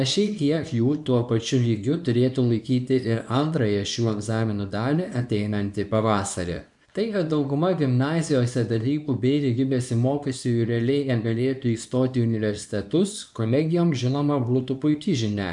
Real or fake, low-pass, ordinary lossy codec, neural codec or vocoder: fake; 10.8 kHz; MP3, 96 kbps; codec, 24 kHz, 0.9 kbps, WavTokenizer, small release